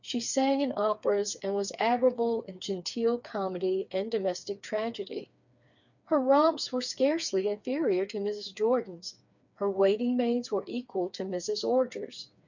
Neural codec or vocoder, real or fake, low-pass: codec, 16 kHz, 4 kbps, FreqCodec, smaller model; fake; 7.2 kHz